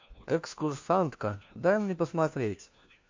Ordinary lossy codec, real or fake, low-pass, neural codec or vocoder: MP3, 48 kbps; fake; 7.2 kHz; codec, 16 kHz, 1 kbps, FunCodec, trained on LibriTTS, 50 frames a second